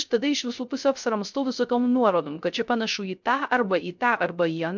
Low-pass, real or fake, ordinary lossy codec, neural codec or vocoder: 7.2 kHz; fake; MP3, 64 kbps; codec, 16 kHz, 0.3 kbps, FocalCodec